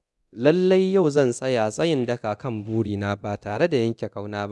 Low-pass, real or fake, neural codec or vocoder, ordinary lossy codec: none; fake; codec, 24 kHz, 0.9 kbps, DualCodec; none